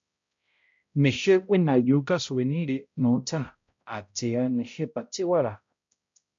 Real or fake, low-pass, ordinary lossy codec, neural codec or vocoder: fake; 7.2 kHz; MP3, 48 kbps; codec, 16 kHz, 0.5 kbps, X-Codec, HuBERT features, trained on balanced general audio